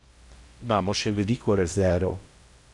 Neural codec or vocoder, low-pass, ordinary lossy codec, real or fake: codec, 16 kHz in and 24 kHz out, 0.6 kbps, FocalCodec, streaming, 2048 codes; 10.8 kHz; none; fake